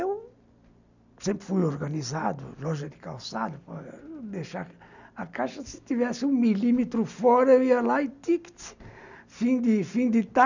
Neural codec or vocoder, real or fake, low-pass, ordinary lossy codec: none; real; 7.2 kHz; none